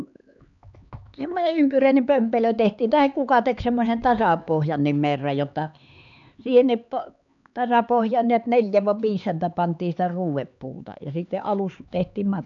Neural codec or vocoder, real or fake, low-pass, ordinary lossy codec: codec, 16 kHz, 4 kbps, X-Codec, HuBERT features, trained on LibriSpeech; fake; 7.2 kHz; none